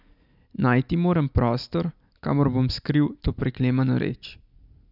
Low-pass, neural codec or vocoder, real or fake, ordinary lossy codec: 5.4 kHz; none; real; none